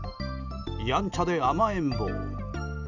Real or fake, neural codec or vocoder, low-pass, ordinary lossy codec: real; none; 7.2 kHz; none